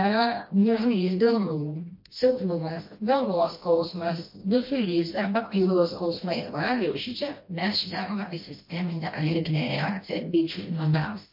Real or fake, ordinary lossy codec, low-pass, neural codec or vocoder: fake; MP3, 32 kbps; 5.4 kHz; codec, 16 kHz, 1 kbps, FreqCodec, smaller model